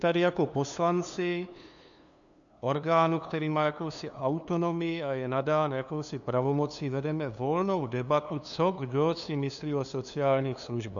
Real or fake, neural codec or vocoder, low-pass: fake; codec, 16 kHz, 2 kbps, FunCodec, trained on LibriTTS, 25 frames a second; 7.2 kHz